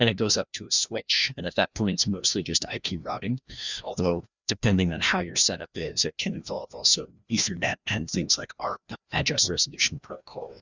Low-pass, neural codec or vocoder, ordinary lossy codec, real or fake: 7.2 kHz; codec, 16 kHz, 1 kbps, FreqCodec, larger model; Opus, 64 kbps; fake